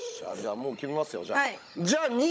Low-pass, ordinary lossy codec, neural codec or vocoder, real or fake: none; none; codec, 16 kHz, 16 kbps, FunCodec, trained on Chinese and English, 50 frames a second; fake